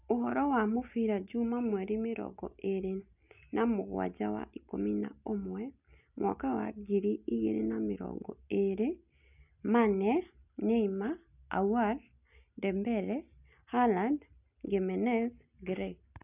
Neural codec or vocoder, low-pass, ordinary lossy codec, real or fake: none; 3.6 kHz; none; real